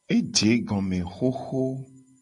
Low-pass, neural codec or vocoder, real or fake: 10.8 kHz; none; real